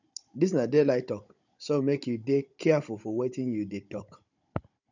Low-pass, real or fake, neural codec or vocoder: 7.2 kHz; fake; codec, 16 kHz, 16 kbps, FunCodec, trained on Chinese and English, 50 frames a second